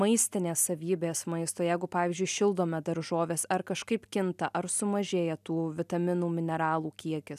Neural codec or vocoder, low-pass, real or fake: none; 14.4 kHz; real